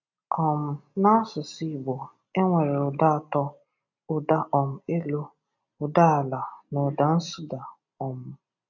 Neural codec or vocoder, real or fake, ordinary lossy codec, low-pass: none; real; none; 7.2 kHz